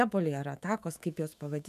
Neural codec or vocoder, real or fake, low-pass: autoencoder, 48 kHz, 128 numbers a frame, DAC-VAE, trained on Japanese speech; fake; 14.4 kHz